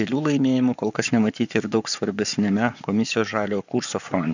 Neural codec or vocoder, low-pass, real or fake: codec, 44.1 kHz, 7.8 kbps, Pupu-Codec; 7.2 kHz; fake